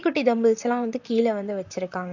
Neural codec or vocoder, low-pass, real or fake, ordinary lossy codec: vocoder, 44.1 kHz, 128 mel bands, Pupu-Vocoder; 7.2 kHz; fake; none